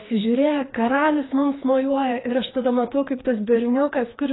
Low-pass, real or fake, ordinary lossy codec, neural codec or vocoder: 7.2 kHz; fake; AAC, 16 kbps; codec, 16 kHz, 2 kbps, FreqCodec, larger model